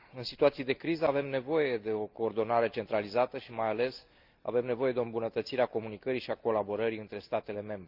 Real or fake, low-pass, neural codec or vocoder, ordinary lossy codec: real; 5.4 kHz; none; Opus, 24 kbps